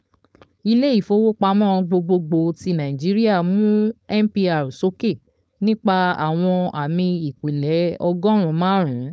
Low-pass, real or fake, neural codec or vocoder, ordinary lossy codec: none; fake; codec, 16 kHz, 4.8 kbps, FACodec; none